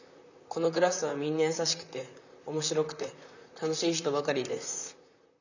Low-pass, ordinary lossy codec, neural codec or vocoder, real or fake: 7.2 kHz; none; vocoder, 44.1 kHz, 128 mel bands, Pupu-Vocoder; fake